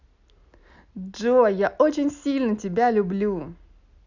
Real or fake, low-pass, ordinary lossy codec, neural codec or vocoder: real; 7.2 kHz; none; none